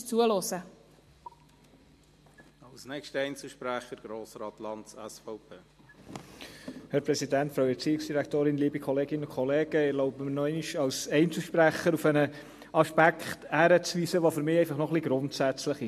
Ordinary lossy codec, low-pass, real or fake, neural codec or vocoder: MP3, 64 kbps; 14.4 kHz; real; none